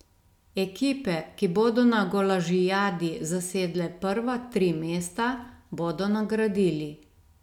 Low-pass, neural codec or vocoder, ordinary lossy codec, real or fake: 19.8 kHz; none; none; real